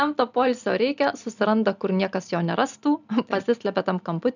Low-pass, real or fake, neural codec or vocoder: 7.2 kHz; real; none